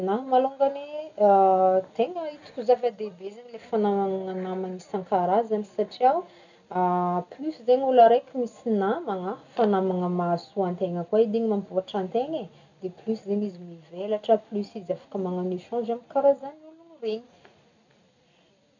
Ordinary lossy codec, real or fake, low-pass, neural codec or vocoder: none; real; 7.2 kHz; none